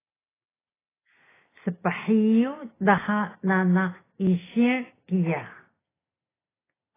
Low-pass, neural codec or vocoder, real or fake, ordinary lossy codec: 3.6 kHz; none; real; AAC, 16 kbps